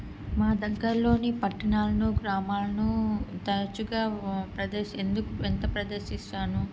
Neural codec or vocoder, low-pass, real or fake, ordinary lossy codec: none; none; real; none